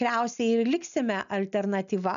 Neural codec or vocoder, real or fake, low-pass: none; real; 7.2 kHz